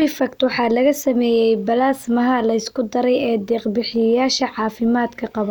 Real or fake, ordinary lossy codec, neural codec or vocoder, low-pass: real; none; none; 19.8 kHz